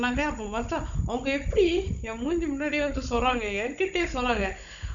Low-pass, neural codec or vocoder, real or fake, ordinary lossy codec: 7.2 kHz; codec, 16 kHz, 16 kbps, FunCodec, trained on Chinese and English, 50 frames a second; fake; none